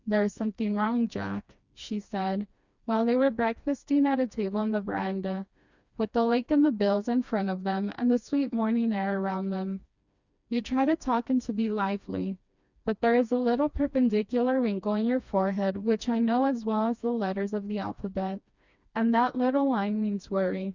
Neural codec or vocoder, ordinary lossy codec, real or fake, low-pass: codec, 16 kHz, 2 kbps, FreqCodec, smaller model; Opus, 64 kbps; fake; 7.2 kHz